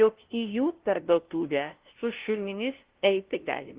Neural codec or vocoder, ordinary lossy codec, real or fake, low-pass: codec, 16 kHz, 0.5 kbps, FunCodec, trained on LibriTTS, 25 frames a second; Opus, 16 kbps; fake; 3.6 kHz